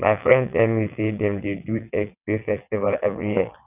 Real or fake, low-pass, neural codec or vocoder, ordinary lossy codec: fake; 3.6 kHz; vocoder, 22.05 kHz, 80 mel bands, WaveNeXt; none